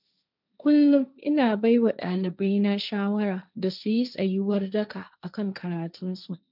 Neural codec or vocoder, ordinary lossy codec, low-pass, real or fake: codec, 16 kHz, 1.1 kbps, Voila-Tokenizer; none; 5.4 kHz; fake